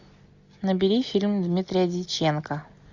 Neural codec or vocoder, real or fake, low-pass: none; real; 7.2 kHz